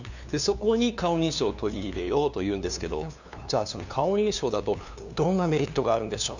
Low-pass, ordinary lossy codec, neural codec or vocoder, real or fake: 7.2 kHz; none; codec, 16 kHz, 2 kbps, FunCodec, trained on LibriTTS, 25 frames a second; fake